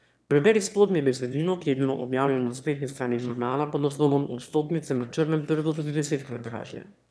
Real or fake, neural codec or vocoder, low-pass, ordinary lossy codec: fake; autoencoder, 22.05 kHz, a latent of 192 numbers a frame, VITS, trained on one speaker; none; none